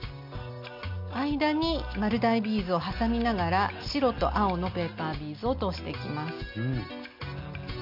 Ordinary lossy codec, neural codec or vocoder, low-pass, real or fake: MP3, 48 kbps; none; 5.4 kHz; real